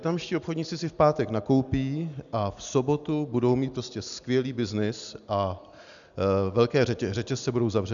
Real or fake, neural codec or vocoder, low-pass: real; none; 7.2 kHz